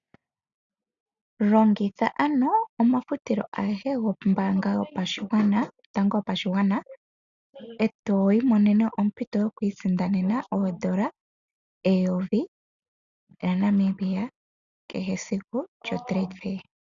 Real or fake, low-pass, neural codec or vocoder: real; 7.2 kHz; none